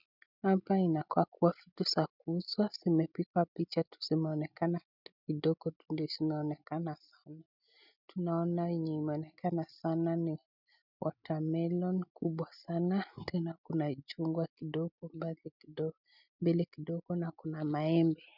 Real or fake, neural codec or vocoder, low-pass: real; none; 5.4 kHz